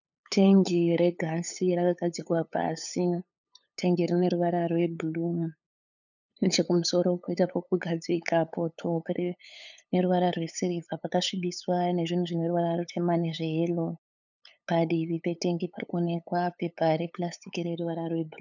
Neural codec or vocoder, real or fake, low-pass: codec, 16 kHz, 8 kbps, FunCodec, trained on LibriTTS, 25 frames a second; fake; 7.2 kHz